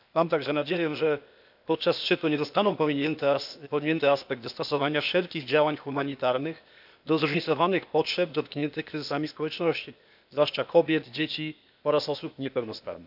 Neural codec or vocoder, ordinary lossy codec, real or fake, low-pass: codec, 16 kHz, 0.8 kbps, ZipCodec; none; fake; 5.4 kHz